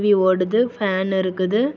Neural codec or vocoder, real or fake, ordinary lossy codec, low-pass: none; real; none; 7.2 kHz